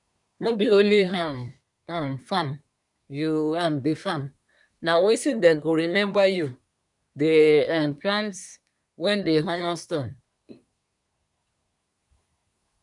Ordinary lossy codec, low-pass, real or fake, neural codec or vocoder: none; 10.8 kHz; fake; codec, 24 kHz, 1 kbps, SNAC